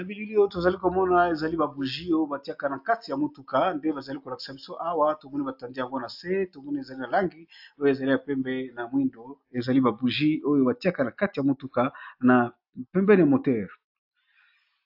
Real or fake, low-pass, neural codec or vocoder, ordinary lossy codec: real; 5.4 kHz; none; AAC, 48 kbps